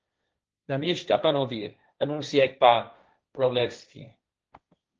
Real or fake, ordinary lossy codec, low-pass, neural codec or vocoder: fake; Opus, 24 kbps; 7.2 kHz; codec, 16 kHz, 1.1 kbps, Voila-Tokenizer